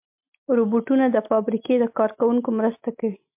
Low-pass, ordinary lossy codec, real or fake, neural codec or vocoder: 3.6 kHz; MP3, 32 kbps; real; none